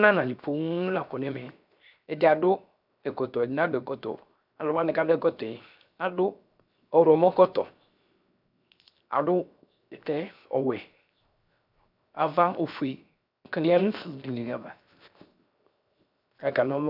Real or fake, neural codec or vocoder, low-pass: fake; codec, 16 kHz, 0.7 kbps, FocalCodec; 5.4 kHz